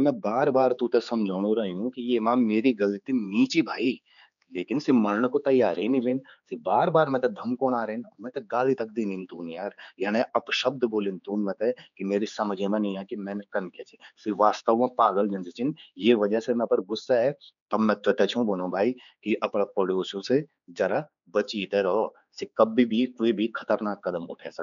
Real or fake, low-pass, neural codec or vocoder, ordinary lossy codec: fake; 7.2 kHz; codec, 16 kHz, 4 kbps, X-Codec, HuBERT features, trained on general audio; none